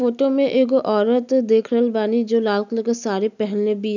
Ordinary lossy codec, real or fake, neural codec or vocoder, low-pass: none; real; none; 7.2 kHz